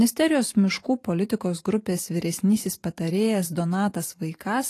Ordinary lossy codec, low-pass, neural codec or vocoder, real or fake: AAC, 48 kbps; 14.4 kHz; vocoder, 44.1 kHz, 128 mel bands every 512 samples, BigVGAN v2; fake